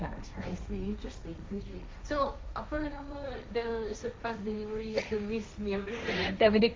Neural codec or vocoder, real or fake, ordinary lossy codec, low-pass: codec, 16 kHz, 1.1 kbps, Voila-Tokenizer; fake; none; none